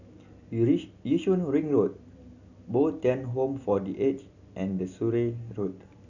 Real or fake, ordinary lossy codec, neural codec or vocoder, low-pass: real; none; none; 7.2 kHz